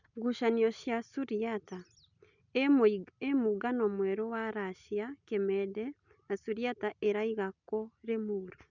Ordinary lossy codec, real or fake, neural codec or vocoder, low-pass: none; real; none; 7.2 kHz